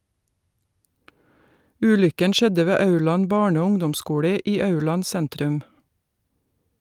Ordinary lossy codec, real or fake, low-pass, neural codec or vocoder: Opus, 32 kbps; real; 19.8 kHz; none